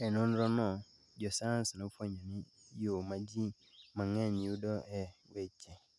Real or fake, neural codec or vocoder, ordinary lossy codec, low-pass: real; none; none; none